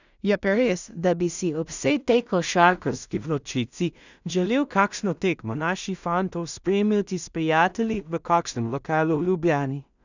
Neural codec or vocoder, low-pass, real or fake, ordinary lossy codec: codec, 16 kHz in and 24 kHz out, 0.4 kbps, LongCat-Audio-Codec, two codebook decoder; 7.2 kHz; fake; none